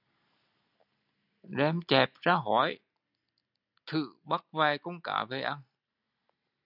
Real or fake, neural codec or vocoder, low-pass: real; none; 5.4 kHz